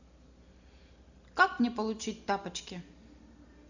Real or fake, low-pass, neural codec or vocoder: real; 7.2 kHz; none